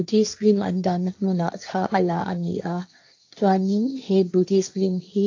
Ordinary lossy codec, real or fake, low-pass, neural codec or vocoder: AAC, 48 kbps; fake; 7.2 kHz; codec, 16 kHz, 1.1 kbps, Voila-Tokenizer